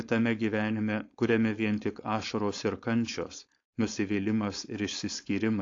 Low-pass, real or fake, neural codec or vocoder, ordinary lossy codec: 7.2 kHz; fake; codec, 16 kHz, 4.8 kbps, FACodec; AAC, 48 kbps